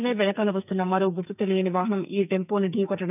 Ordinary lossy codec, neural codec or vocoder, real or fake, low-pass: none; codec, 44.1 kHz, 2.6 kbps, SNAC; fake; 3.6 kHz